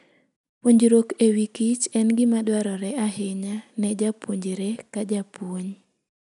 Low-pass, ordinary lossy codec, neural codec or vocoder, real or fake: 10.8 kHz; none; none; real